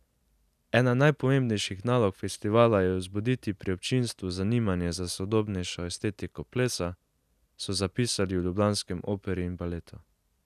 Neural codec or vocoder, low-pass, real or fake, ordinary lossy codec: none; 14.4 kHz; real; none